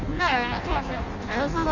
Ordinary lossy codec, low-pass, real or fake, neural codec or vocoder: none; 7.2 kHz; fake; codec, 16 kHz in and 24 kHz out, 0.6 kbps, FireRedTTS-2 codec